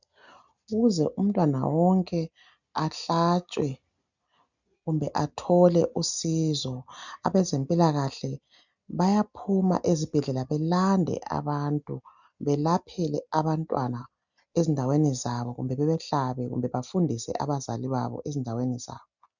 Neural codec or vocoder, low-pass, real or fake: none; 7.2 kHz; real